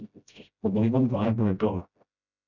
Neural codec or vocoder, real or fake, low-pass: codec, 16 kHz, 0.5 kbps, FreqCodec, smaller model; fake; 7.2 kHz